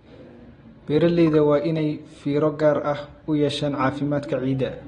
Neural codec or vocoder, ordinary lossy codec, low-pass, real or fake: none; AAC, 32 kbps; 10.8 kHz; real